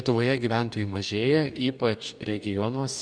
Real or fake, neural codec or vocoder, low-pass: fake; codec, 44.1 kHz, 2.6 kbps, DAC; 9.9 kHz